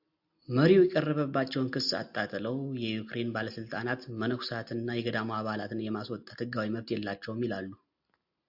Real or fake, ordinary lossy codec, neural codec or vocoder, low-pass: real; AAC, 48 kbps; none; 5.4 kHz